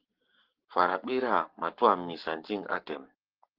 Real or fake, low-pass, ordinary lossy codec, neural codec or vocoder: fake; 5.4 kHz; Opus, 16 kbps; codec, 44.1 kHz, 7.8 kbps, Pupu-Codec